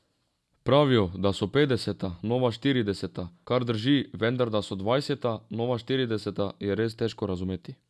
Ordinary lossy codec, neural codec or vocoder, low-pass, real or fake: none; none; none; real